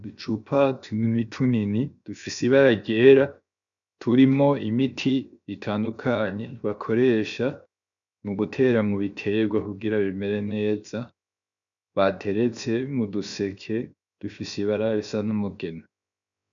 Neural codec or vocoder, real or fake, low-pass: codec, 16 kHz, 0.7 kbps, FocalCodec; fake; 7.2 kHz